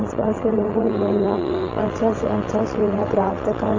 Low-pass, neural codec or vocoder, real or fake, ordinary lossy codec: 7.2 kHz; vocoder, 22.05 kHz, 80 mel bands, WaveNeXt; fake; AAC, 48 kbps